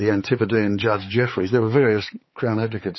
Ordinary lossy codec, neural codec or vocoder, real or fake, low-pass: MP3, 24 kbps; codec, 16 kHz, 4 kbps, X-Codec, HuBERT features, trained on balanced general audio; fake; 7.2 kHz